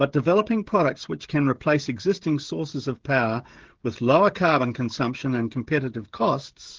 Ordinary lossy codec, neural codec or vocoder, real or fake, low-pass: Opus, 16 kbps; codec, 16 kHz, 16 kbps, FreqCodec, smaller model; fake; 7.2 kHz